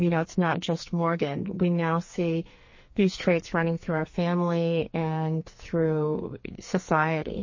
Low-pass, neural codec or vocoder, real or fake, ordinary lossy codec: 7.2 kHz; codec, 44.1 kHz, 2.6 kbps, SNAC; fake; MP3, 32 kbps